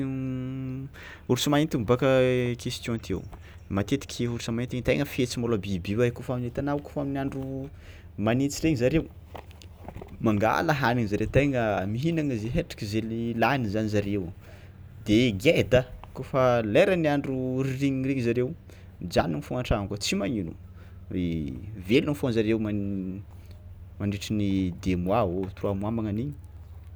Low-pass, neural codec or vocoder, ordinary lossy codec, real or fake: none; none; none; real